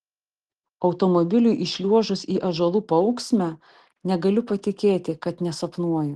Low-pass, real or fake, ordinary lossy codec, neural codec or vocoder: 10.8 kHz; real; Opus, 16 kbps; none